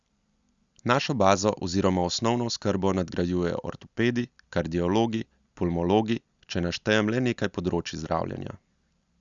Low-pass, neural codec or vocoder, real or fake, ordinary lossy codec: 7.2 kHz; none; real; Opus, 64 kbps